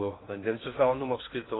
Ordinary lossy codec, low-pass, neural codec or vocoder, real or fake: AAC, 16 kbps; 7.2 kHz; codec, 16 kHz in and 24 kHz out, 0.6 kbps, FocalCodec, streaming, 2048 codes; fake